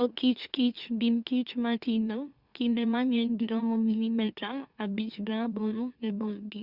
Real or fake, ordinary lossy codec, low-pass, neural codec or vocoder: fake; Opus, 64 kbps; 5.4 kHz; autoencoder, 44.1 kHz, a latent of 192 numbers a frame, MeloTTS